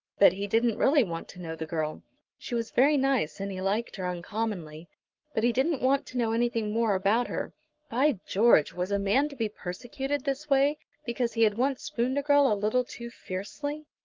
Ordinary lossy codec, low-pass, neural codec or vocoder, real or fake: Opus, 32 kbps; 7.2 kHz; codec, 44.1 kHz, 7.8 kbps, Pupu-Codec; fake